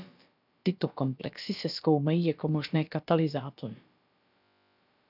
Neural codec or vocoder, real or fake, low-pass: codec, 16 kHz, about 1 kbps, DyCAST, with the encoder's durations; fake; 5.4 kHz